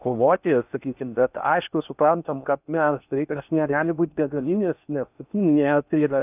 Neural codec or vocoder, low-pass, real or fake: codec, 16 kHz in and 24 kHz out, 0.6 kbps, FocalCodec, streaming, 4096 codes; 3.6 kHz; fake